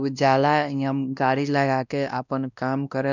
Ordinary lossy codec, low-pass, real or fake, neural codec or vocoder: none; 7.2 kHz; fake; codec, 16 kHz, 1 kbps, X-Codec, WavLM features, trained on Multilingual LibriSpeech